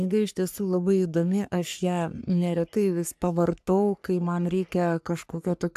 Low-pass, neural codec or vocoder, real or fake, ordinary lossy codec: 14.4 kHz; codec, 44.1 kHz, 3.4 kbps, Pupu-Codec; fake; AAC, 96 kbps